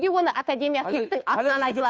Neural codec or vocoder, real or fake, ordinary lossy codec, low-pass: codec, 16 kHz, 2 kbps, FunCodec, trained on Chinese and English, 25 frames a second; fake; none; none